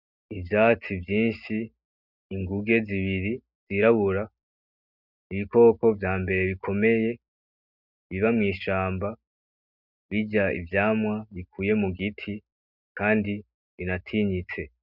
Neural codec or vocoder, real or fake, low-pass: none; real; 5.4 kHz